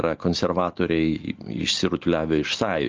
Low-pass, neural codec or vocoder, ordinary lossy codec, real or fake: 7.2 kHz; none; Opus, 24 kbps; real